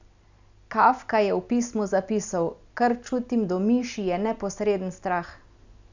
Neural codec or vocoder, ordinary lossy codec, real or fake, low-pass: none; none; real; 7.2 kHz